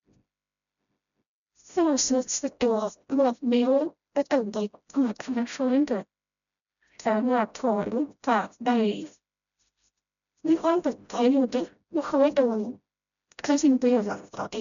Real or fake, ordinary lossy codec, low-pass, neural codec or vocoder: fake; none; 7.2 kHz; codec, 16 kHz, 0.5 kbps, FreqCodec, smaller model